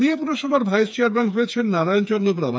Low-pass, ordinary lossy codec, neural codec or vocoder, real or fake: none; none; codec, 16 kHz, 4 kbps, FreqCodec, smaller model; fake